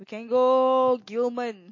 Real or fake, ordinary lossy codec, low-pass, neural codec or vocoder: real; MP3, 32 kbps; 7.2 kHz; none